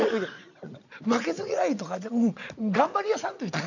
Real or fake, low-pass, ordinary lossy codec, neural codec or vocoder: fake; 7.2 kHz; none; vocoder, 22.05 kHz, 80 mel bands, Vocos